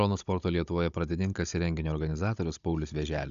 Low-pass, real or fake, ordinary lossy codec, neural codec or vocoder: 7.2 kHz; fake; MP3, 96 kbps; codec, 16 kHz, 16 kbps, FunCodec, trained on Chinese and English, 50 frames a second